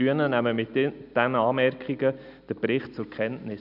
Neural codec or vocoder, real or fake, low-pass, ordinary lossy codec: none; real; 5.4 kHz; none